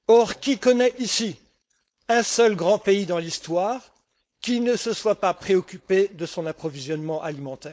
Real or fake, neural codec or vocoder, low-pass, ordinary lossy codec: fake; codec, 16 kHz, 4.8 kbps, FACodec; none; none